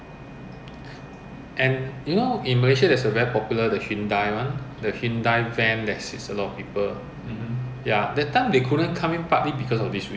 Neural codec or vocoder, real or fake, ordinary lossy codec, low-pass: none; real; none; none